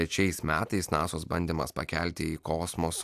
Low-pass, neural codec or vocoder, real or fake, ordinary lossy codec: 14.4 kHz; none; real; AAC, 96 kbps